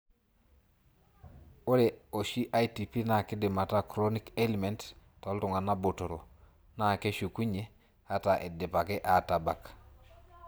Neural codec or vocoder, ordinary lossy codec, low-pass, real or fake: none; none; none; real